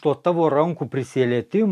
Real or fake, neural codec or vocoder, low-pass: real; none; 14.4 kHz